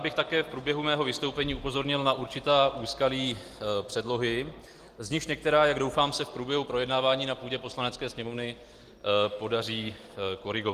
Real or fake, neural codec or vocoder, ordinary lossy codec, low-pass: real; none; Opus, 24 kbps; 14.4 kHz